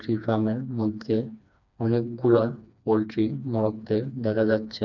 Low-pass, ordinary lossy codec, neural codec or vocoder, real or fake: 7.2 kHz; none; codec, 16 kHz, 2 kbps, FreqCodec, smaller model; fake